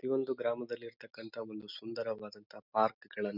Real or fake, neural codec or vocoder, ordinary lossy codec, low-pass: real; none; none; 5.4 kHz